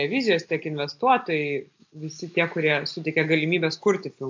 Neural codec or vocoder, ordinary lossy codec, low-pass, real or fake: none; AAC, 48 kbps; 7.2 kHz; real